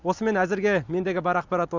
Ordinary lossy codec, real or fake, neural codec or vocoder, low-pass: Opus, 64 kbps; real; none; 7.2 kHz